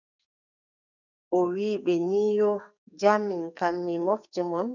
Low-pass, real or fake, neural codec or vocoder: 7.2 kHz; fake; codec, 44.1 kHz, 2.6 kbps, SNAC